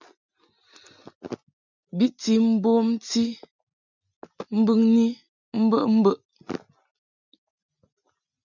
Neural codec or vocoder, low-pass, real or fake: none; 7.2 kHz; real